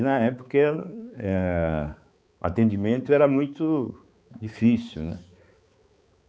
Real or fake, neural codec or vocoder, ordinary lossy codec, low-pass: fake; codec, 16 kHz, 4 kbps, X-Codec, HuBERT features, trained on balanced general audio; none; none